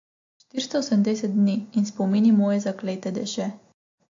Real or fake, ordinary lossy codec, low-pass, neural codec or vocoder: real; AAC, 48 kbps; 7.2 kHz; none